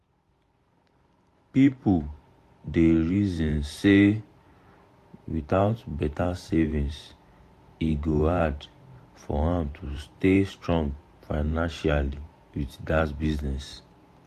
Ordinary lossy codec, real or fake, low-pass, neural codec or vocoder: AAC, 64 kbps; fake; 14.4 kHz; vocoder, 44.1 kHz, 128 mel bands every 512 samples, BigVGAN v2